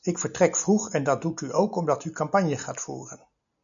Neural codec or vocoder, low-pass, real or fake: none; 7.2 kHz; real